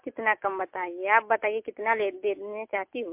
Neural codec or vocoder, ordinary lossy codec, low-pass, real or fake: none; MP3, 32 kbps; 3.6 kHz; real